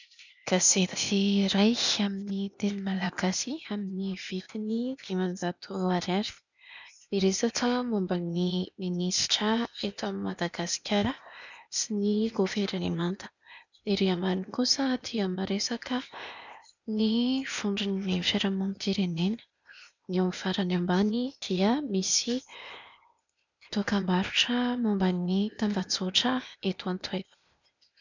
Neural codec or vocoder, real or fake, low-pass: codec, 16 kHz, 0.8 kbps, ZipCodec; fake; 7.2 kHz